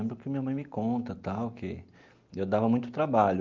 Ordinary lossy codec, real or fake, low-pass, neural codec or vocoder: Opus, 32 kbps; real; 7.2 kHz; none